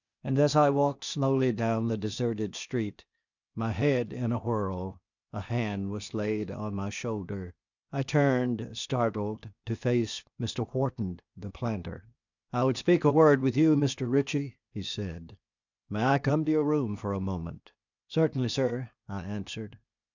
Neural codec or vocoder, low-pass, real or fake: codec, 16 kHz, 0.8 kbps, ZipCodec; 7.2 kHz; fake